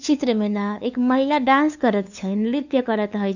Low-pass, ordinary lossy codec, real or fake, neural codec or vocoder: 7.2 kHz; none; fake; codec, 16 kHz, 2 kbps, FunCodec, trained on LibriTTS, 25 frames a second